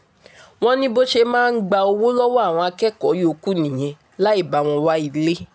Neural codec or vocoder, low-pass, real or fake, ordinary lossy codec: none; none; real; none